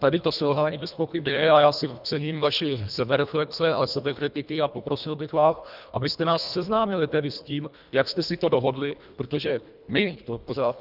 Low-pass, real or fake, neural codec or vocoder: 5.4 kHz; fake; codec, 24 kHz, 1.5 kbps, HILCodec